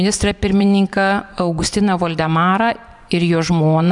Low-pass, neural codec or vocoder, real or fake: 10.8 kHz; vocoder, 24 kHz, 100 mel bands, Vocos; fake